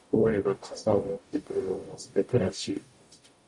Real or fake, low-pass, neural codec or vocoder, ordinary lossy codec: fake; 10.8 kHz; codec, 44.1 kHz, 0.9 kbps, DAC; MP3, 96 kbps